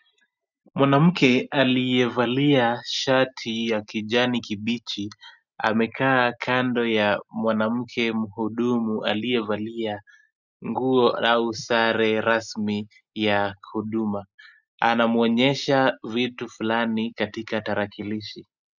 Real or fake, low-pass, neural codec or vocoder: real; 7.2 kHz; none